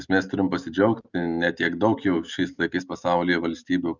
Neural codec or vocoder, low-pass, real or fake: none; 7.2 kHz; real